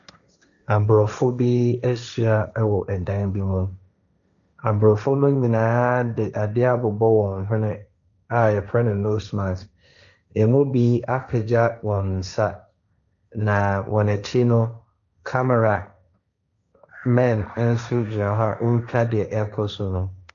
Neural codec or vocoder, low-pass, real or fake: codec, 16 kHz, 1.1 kbps, Voila-Tokenizer; 7.2 kHz; fake